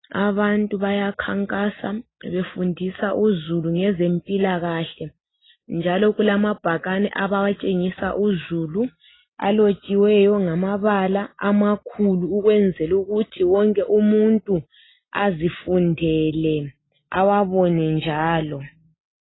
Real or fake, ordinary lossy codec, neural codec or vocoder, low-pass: real; AAC, 16 kbps; none; 7.2 kHz